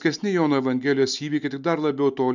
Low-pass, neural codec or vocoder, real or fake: 7.2 kHz; none; real